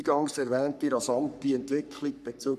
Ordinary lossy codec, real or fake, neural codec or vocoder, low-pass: none; fake; codec, 44.1 kHz, 3.4 kbps, Pupu-Codec; 14.4 kHz